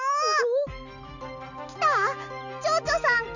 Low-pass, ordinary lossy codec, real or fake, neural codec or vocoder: 7.2 kHz; none; real; none